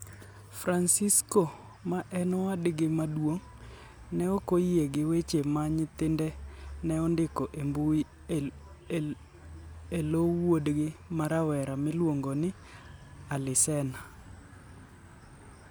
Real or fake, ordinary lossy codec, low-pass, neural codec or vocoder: real; none; none; none